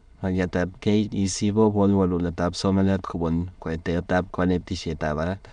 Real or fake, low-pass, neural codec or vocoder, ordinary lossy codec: fake; 9.9 kHz; autoencoder, 22.05 kHz, a latent of 192 numbers a frame, VITS, trained on many speakers; MP3, 96 kbps